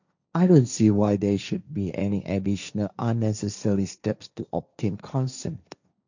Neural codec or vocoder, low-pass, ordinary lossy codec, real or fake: codec, 16 kHz, 1.1 kbps, Voila-Tokenizer; 7.2 kHz; none; fake